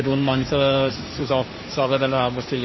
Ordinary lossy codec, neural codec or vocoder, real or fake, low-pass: MP3, 24 kbps; codec, 16 kHz, 1.1 kbps, Voila-Tokenizer; fake; 7.2 kHz